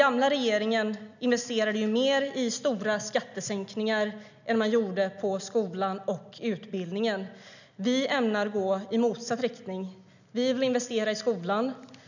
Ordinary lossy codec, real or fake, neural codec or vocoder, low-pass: none; real; none; 7.2 kHz